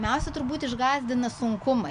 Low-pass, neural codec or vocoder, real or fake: 9.9 kHz; none; real